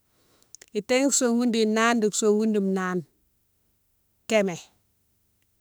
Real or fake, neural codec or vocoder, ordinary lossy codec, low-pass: fake; autoencoder, 48 kHz, 32 numbers a frame, DAC-VAE, trained on Japanese speech; none; none